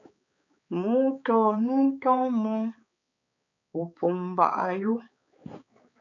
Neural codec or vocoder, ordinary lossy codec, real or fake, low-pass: codec, 16 kHz, 4 kbps, X-Codec, HuBERT features, trained on balanced general audio; AAC, 64 kbps; fake; 7.2 kHz